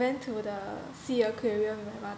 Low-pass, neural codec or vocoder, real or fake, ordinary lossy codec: none; none; real; none